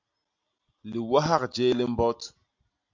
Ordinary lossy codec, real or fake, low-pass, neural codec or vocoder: MP3, 64 kbps; real; 7.2 kHz; none